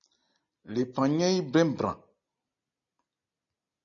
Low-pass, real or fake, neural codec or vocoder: 7.2 kHz; real; none